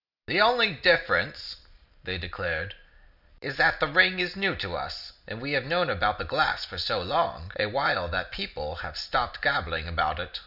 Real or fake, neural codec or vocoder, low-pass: real; none; 5.4 kHz